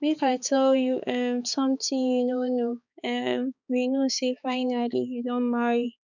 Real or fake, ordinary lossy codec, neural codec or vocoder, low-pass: fake; none; codec, 16 kHz, 4 kbps, X-Codec, HuBERT features, trained on balanced general audio; 7.2 kHz